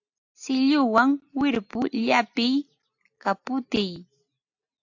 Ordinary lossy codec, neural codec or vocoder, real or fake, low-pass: AAC, 48 kbps; none; real; 7.2 kHz